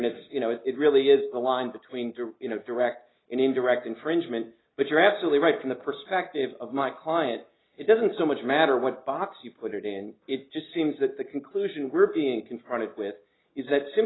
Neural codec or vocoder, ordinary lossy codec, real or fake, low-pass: none; AAC, 16 kbps; real; 7.2 kHz